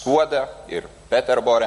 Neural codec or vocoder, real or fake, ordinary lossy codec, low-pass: none; real; MP3, 48 kbps; 14.4 kHz